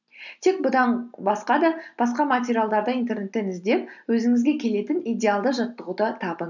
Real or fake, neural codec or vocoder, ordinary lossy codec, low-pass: real; none; none; 7.2 kHz